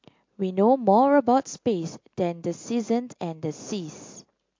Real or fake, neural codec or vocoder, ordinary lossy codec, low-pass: real; none; MP3, 48 kbps; 7.2 kHz